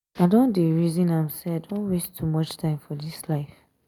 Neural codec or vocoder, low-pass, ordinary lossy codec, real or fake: none; 19.8 kHz; none; real